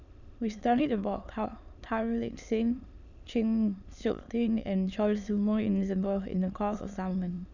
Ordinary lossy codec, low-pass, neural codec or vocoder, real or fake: none; 7.2 kHz; autoencoder, 22.05 kHz, a latent of 192 numbers a frame, VITS, trained on many speakers; fake